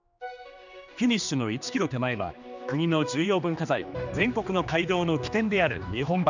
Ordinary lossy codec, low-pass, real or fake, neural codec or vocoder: none; 7.2 kHz; fake; codec, 16 kHz, 2 kbps, X-Codec, HuBERT features, trained on general audio